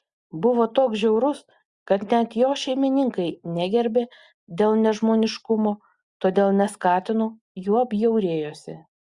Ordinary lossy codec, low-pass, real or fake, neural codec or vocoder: Opus, 64 kbps; 10.8 kHz; real; none